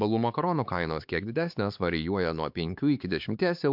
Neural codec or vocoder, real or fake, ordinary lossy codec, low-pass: codec, 16 kHz, 4 kbps, X-Codec, HuBERT features, trained on LibriSpeech; fake; MP3, 48 kbps; 5.4 kHz